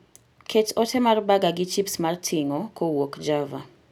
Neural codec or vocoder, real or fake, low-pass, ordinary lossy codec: none; real; none; none